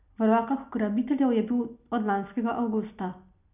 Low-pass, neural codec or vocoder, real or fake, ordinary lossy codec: 3.6 kHz; none; real; none